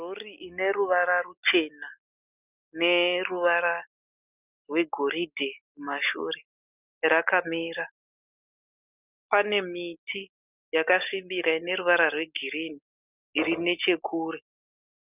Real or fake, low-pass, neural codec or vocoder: real; 3.6 kHz; none